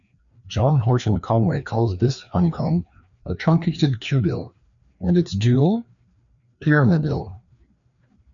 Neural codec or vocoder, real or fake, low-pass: codec, 16 kHz, 2 kbps, FreqCodec, larger model; fake; 7.2 kHz